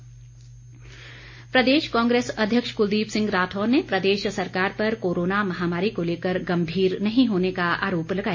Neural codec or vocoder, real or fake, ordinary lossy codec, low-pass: none; real; MP3, 32 kbps; 7.2 kHz